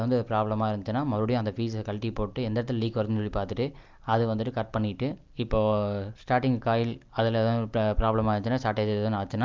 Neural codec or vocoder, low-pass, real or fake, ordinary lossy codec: none; 7.2 kHz; real; Opus, 32 kbps